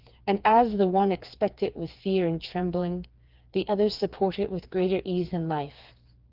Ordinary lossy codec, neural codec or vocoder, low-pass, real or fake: Opus, 32 kbps; codec, 16 kHz, 4 kbps, FreqCodec, smaller model; 5.4 kHz; fake